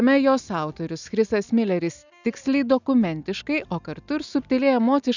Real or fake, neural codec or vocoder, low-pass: real; none; 7.2 kHz